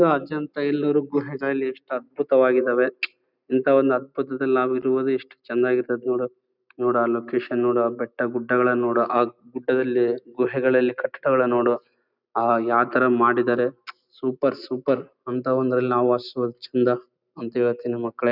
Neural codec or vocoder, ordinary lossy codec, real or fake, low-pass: none; none; real; 5.4 kHz